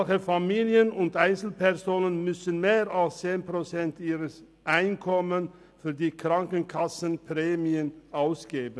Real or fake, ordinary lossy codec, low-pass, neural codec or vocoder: real; none; none; none